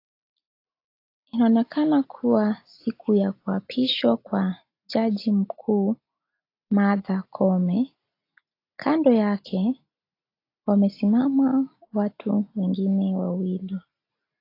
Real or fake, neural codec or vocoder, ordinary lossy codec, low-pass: real; none; AAC, 32 kbps; 5.4 kHz